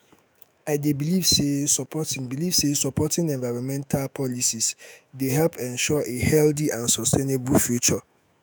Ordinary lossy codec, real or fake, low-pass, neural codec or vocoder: none; fake; none; autoencoder, 48 kHz, 128 numbers a frame, DAC-VAE, trained on Japanese speech